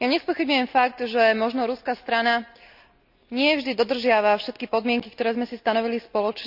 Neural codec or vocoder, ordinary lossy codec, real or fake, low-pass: none; none; real; 5.4 kHz